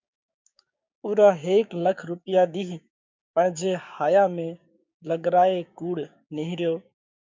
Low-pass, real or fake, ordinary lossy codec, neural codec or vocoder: 7.2 kHz; fake; MP3, 64 kbps; codec, 16 kHz, 6 kbps, DAC